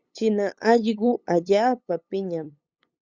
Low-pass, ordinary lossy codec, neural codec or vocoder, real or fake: 7.2 kHz; Opus, 64 kbps; codec, 16 kHz, 8 kbps, FunCodec, trained on LibriTTS, 25 frames a second; fake